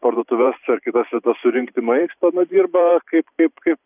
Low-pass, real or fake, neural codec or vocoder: 3.6 kHz; fake; vocoder, 44.1 kHz, 128 mel bands every 512 samples, BigVGAN v2